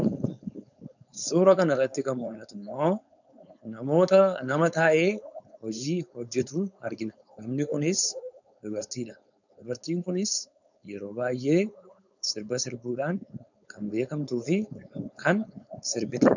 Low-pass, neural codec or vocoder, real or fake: 7.2 kHz; codec, 16 kHz, 4.8 kbps, FACodec; fake